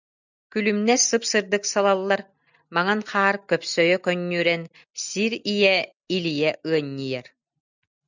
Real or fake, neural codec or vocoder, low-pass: real; none; 7.2 kHz